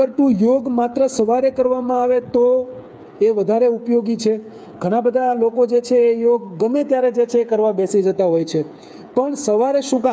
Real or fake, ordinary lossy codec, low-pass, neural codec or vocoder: fake; none; none; codec, 16 kHz, 8 kbps, FreqCodec, smaller model